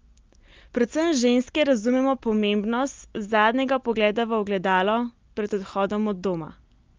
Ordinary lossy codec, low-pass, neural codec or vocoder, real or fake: Opus, 32 kbps; 7.2 kHz; none; real